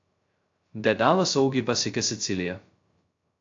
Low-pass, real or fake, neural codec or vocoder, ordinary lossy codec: 7.2 kHz; fake; codec, 16 kHz, 0.2 kbps, FocalCodec; AAC, 48 kbps